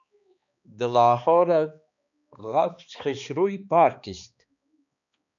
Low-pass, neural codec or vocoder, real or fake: 7.2 kHz; codec, 16 kHz, 2 kbps, X-Codec, HuBERT features, trained on balanced general audio; fake